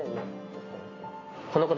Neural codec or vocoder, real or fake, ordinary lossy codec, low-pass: none; real; MP3, 32 kbps; 7.2 kHz